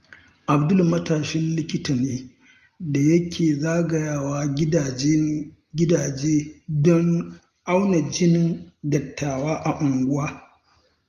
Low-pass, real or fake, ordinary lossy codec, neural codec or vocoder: 14.4 kHz; real; Opus, 32 kbps; none